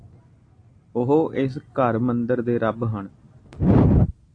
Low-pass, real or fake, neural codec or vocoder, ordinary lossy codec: 9.9 kHz; real; none; AAC, 48 kbps